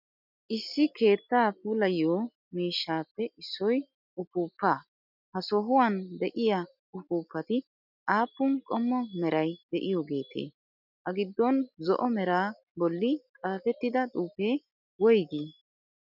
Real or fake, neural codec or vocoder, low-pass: real; none; 5.4 kHz